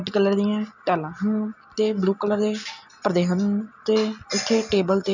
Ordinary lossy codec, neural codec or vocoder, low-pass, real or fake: none; none; 7.2 kHz; real